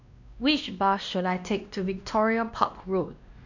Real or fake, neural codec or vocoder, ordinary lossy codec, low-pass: fake; codec, 16 kHz, 1 kbps, X-Codec, WavLM features, trained on Multilingual LibriSpeech; none; 7.2 kHz